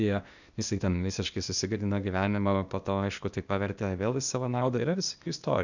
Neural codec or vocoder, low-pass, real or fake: codec, 16 kHz, 0.8 kbps, ZipCodec; 7.2 kHz; fake